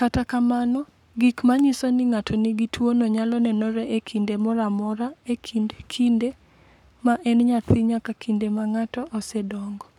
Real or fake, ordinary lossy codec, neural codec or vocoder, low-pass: fake; none; codec, 44.1 kHz, 7.8 kbps, Pupu-Codec; 19.8 kHz